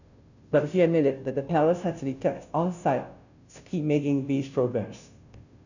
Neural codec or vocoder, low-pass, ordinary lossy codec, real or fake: codec, 16 kHz, 0.5 kbps, FunCodec, trained on Chinese and English, 25 frames a second; 7.2 kHz; none; fake